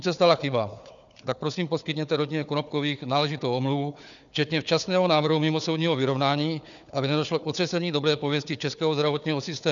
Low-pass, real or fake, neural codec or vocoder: 7.2 kHz; fake; codec, 16 kHz, 4 kbps, FunCodec, trained on Chinese and English, 50 frames a second